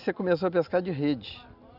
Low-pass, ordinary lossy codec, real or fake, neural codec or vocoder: 5.4 kHz; none; real; none